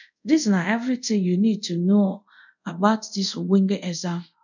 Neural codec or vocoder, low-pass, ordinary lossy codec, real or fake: codec, 24 kHz, 0.5 kbps, DualCodec; 7.2 kHz; none; fake